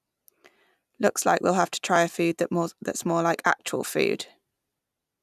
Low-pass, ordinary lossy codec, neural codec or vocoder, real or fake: 14.4 kHz; none; none; real